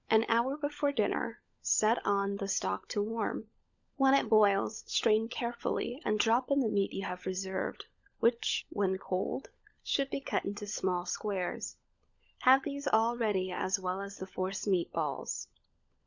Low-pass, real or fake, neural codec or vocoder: 7.2 kHz; fake; codec, 16 kHz, 16 kbps, FunCodec, trained on LibriTTS, 50 frames a second